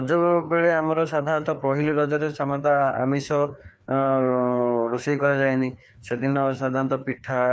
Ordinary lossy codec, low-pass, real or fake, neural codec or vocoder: none; none; fake; codec, 16 kHz, 2 kbps, FreqCodec, larger model